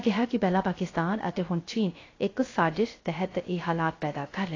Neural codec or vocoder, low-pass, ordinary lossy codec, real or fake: codec, 16 kHz, 0.3 kbps, FocalCodec; 7.2 kHz; AAC, 32 kbps; fake